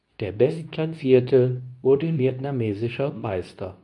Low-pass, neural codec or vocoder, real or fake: 10.8 kHz; codec, 24 kHz, 0.9 kbps, WavTokenizer, medium speech release version 2; fake